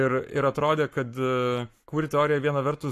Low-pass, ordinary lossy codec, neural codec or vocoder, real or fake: 14.4 kHz; AAC, 64 kbps; codec, 44.1 kHz, 7.8 kbps, Pupu-Codec; fake